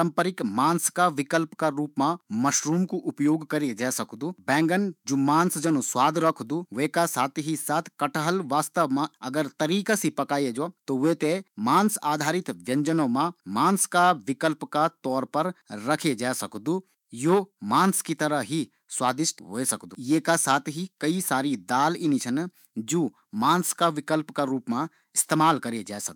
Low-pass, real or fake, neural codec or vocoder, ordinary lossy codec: 19.8 kHz; fake; autoencoder, 48 kHz, 128 numbers a frame, DAC-VAE, trained on Japanese speech; none